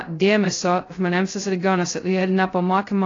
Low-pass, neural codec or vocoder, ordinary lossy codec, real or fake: 7.2 kHz; codec, 16 kHz, 0.2 kbps, FocalCodec; AAC, 32 kbps; fake